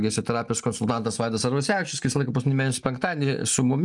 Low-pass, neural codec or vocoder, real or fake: 10.8 kHz; none; real